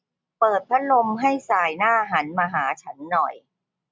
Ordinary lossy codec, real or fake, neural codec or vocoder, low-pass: none; real; none; none